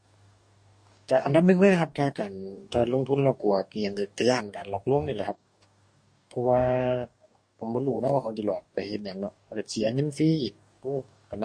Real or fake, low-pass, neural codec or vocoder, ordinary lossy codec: fake; 9.9 kHz; codec, 44.1 kHz, 2.6 kbps, DAC; MP3, 48 kbps